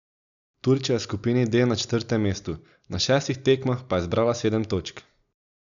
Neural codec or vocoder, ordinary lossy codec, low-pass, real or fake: none; none; 7.2 kHz; real